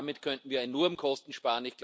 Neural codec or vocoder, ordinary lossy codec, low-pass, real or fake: none; none; none; real